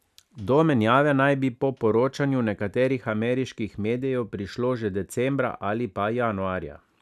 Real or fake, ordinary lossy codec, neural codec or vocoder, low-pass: real; none; none; 14.4 kHz